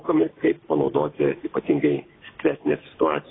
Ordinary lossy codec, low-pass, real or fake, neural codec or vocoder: AAC, 16 kbps; 7.2 kHz; real; none